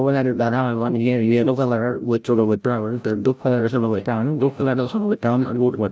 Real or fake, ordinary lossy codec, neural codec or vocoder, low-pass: fake; none; codec, 16 kHz, 0.5 kbps, FreqCodec, larger model; none